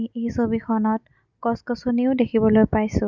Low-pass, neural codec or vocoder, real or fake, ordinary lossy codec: 7.2 kHz; none; real; none